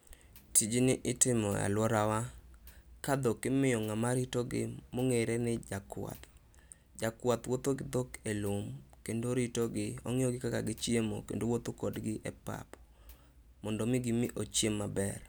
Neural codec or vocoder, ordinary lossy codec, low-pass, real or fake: none; none; none; real